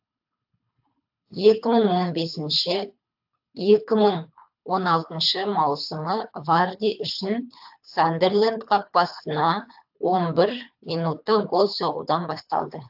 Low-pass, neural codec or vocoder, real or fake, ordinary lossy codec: 5.4 kHz; codec, 24 kHz, 3 kbps, HILCodec; fake; AAC, 48 kbps